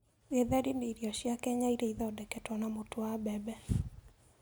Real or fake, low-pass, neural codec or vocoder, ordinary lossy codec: real; none; none; none